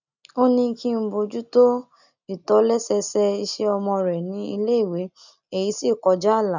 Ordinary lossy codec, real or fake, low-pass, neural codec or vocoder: none; real; 7.2 kHz; none